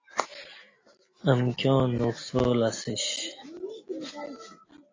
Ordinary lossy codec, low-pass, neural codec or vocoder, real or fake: AAC, 32 kbps; 7.2 kHz; none; real